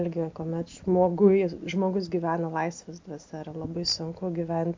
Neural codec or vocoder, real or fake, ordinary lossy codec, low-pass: none; real; MP3, 48 kbps; 7.2 kHz